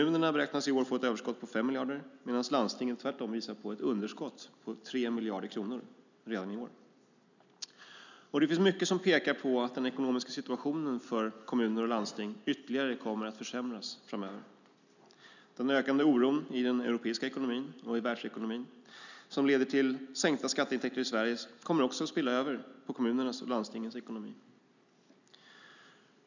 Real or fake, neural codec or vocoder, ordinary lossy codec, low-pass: real; none; none; 7.2 kHz